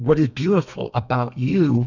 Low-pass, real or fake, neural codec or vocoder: 7.2 kHz; fake; codec, 32 kHz, 1.9 kbps, SNAC